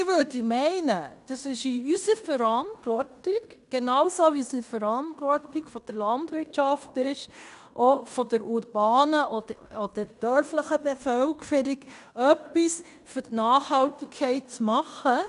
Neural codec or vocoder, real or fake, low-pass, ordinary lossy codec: codec, 16 kHz in and 24 kHz out, 0.9 kbps, LongCat-Audio-Codec, fine tuned four codebook decoder; fake; 10.8 kHz; none